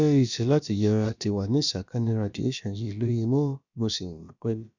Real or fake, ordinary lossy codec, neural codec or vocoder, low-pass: fake; none; codec, 16 kHz, about 1 kbps, DyCAST, with the encoder's durations; 7.2 kHz